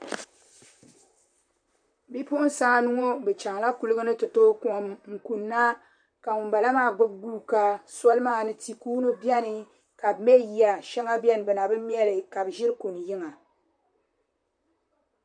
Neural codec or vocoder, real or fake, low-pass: vocoder, 44.1 kHz, 128 mel bands, Pupu-Vocoder; fake; 9.9 kHz